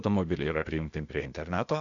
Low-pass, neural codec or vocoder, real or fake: 7.2 kHz; codec, 16 kHz, 0.8 kbps, ZipCodec; fake